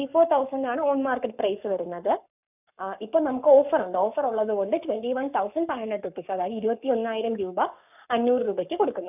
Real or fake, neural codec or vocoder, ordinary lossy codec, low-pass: fake; codec, 44.1 kHz, 7.8 kbps, Pupu-Codec; none; 3.6 kHz